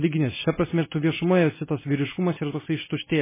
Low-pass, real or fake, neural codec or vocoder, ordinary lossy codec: 3.6 kHz; real; none; MP3, 16 kbps